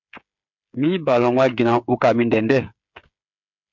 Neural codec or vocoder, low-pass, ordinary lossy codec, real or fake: codec, 16 kHz, 8 kbps, FreqCodec, smaller model; 7.2 kHz; MP3, 64 kbps; fake